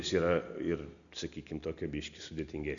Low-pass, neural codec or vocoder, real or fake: 7.2 kHz; none; real